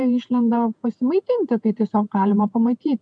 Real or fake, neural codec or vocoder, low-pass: fake; vocoder, 48 kHz, 128 mel bands, Vocos; 9.9 kHz